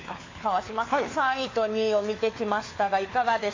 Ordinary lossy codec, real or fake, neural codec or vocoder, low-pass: AAC, 32 kbps; fake; codec, 16 kHz, 4 kbps, FunCodec, trained on LibriTTS, 50 frames a second; 7.2 kHz